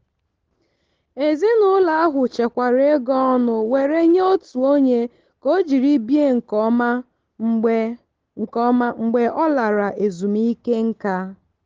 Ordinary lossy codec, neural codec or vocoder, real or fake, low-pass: Opus, 16 kbps; none; real; 7.2 kHz